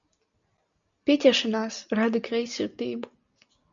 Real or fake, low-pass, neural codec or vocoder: real; 7.2 kHz; none